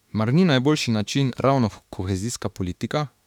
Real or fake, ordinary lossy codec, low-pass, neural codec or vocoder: fake; none; 19.8 kHz; autoencoder, 48 kHz, 32 numbers a frame, DAC-VAE, trained on Japanese speech